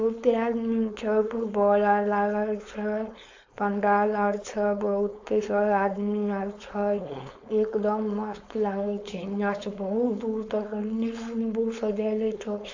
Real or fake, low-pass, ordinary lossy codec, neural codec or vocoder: fake; 7.2 kHz; AAC, 48 kbps; codec, 16 kHz, 4.8 kbps, FACodec